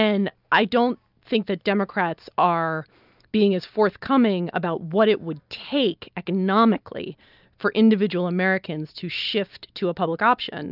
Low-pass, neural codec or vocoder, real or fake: 5.4 kHz; none; real